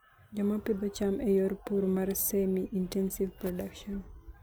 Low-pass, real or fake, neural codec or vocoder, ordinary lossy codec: none; real; none; none